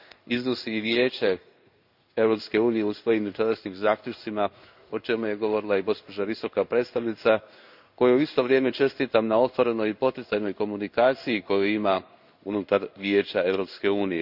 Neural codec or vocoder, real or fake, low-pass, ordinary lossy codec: codec, 16 kHz in and 24 kHz out, 1 kbps, XY-Tokenizer; fake; 5.4 kHz; none